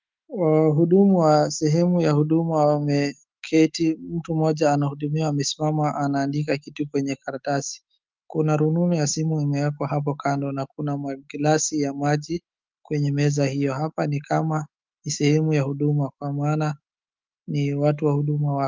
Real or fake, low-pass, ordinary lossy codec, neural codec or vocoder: fake; 7.2 kHz; Opus, 24 kbps; autoencoder, 48 kHz, 128 numbers a frame, DAC-VAE, trained on Japanese speech